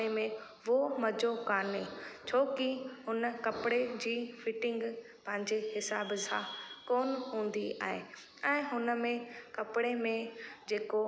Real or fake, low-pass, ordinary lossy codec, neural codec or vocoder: real; none; none; none